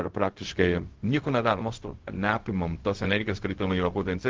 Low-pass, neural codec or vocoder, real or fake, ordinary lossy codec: 7.2 kHz; codec, 16 kHz, 0.4 kbps, LongCat-Audio-Codec; fake; Opus, 16 kbps